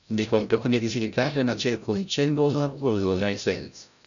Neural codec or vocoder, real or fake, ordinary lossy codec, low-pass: codec, 16 kHz, 0.5 kbps, FreqCodec, larger model; fake; none; 7.2 kHz